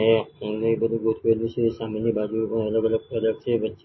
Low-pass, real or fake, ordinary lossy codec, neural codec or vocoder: 7.2 kHz; real; MP3, 24 kbps; none